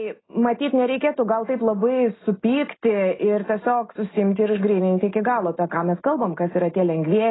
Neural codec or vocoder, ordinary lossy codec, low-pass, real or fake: none; AAC, 16 kbps; 7.2 kHz; real